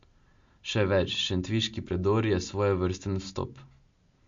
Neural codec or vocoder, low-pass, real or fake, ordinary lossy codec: none; 7.2 kHz; real; MP3, 48 kbps